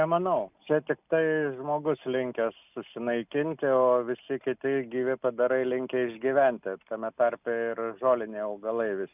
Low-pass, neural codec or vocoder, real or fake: 3.6 kHz; none; real